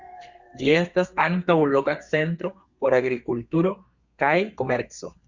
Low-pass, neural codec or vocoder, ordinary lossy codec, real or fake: 7.2 kHz; codec, 32 kHz, 1.9 kbps, SNAC; Opus, 64 kbps; fake